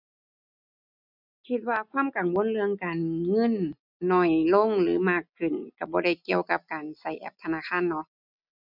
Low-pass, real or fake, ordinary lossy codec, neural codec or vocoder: 5.4 kHz; real; none; none